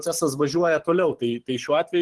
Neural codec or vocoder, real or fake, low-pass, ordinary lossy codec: vocoder, 44.1 kHz, 128 mel bands every 512 samples, BigVGAN v2; fake; 10.8 kHz; Opus, 16 kbps